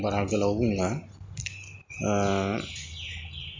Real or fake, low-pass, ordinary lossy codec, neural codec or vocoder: real; 7.2 kHz; MP3, 64 kbps; none